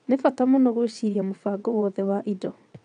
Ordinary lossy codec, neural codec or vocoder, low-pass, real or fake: none; vocoder, 22.05 kHz, 80 mel bands, WaveNeXt; 9.9 kHz; fake